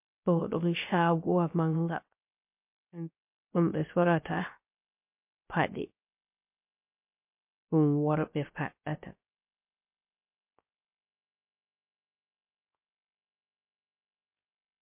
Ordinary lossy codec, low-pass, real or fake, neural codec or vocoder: MP3, 32 kbps; 3.6 kHz; fake; codec, 16 kHz, 0.3 kbps, FocalCodec